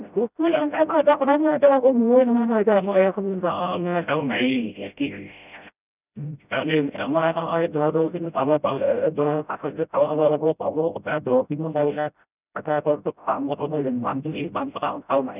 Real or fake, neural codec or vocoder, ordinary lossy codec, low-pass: fake; codec, 16 kHz, 0.5 kbps, FreqCodec, smaller model; none; 3.6 kHz